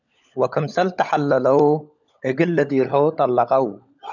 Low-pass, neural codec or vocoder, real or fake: 7.2 kHz; codec, 16 kHz, 16 kbps, FunCodec, trained on LibriTTS, 50 frames a second; fake